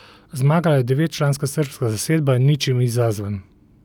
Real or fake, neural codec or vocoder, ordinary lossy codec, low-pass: real; none; none; 19.8 kHz